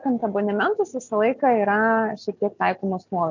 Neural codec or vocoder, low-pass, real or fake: none; 7.2 kHz; real